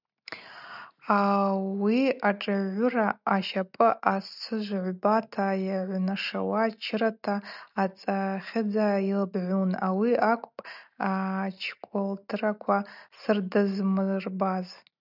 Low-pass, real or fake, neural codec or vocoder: 5.4 kHz; real; none